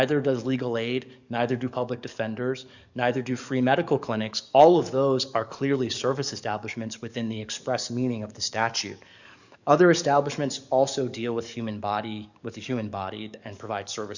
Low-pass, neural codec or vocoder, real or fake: 7.2 kHz; codec, 44.1 kHz, 7.8 kbps, DAC; fake